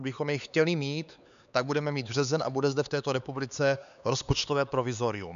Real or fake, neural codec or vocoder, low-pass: fake; codec, 16 kHz, 4 kbps, X-Codec, HuBERT features, trained on LibriSpeech; 7.2 kHz